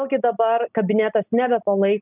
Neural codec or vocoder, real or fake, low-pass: none; real; 3.6 kHz